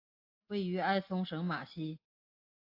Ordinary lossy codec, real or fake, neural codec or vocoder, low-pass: AAC, 32 kbps; real; none; 5.4 kHz